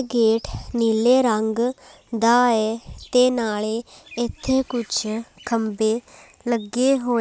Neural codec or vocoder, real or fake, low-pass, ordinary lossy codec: none; real; none; none